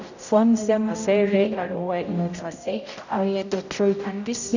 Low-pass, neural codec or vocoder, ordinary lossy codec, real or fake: 7.2 kHz; codec, 16 kHz, 0.5 kbps, X-Codec, HuBERT features, trained on general audio; none; fake